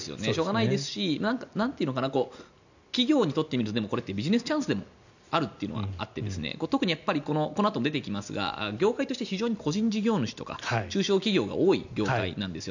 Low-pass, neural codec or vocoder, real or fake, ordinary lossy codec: 7.2 kHz; none; real; none